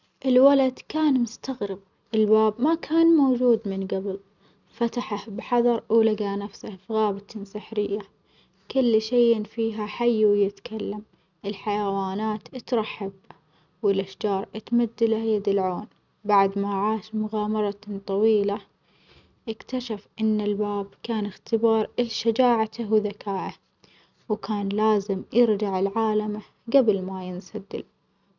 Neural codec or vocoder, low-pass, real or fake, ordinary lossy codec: none; 7.2 kHz; real; none